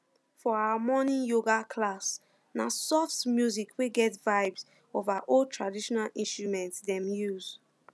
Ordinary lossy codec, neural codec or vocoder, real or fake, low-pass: none; none; real; none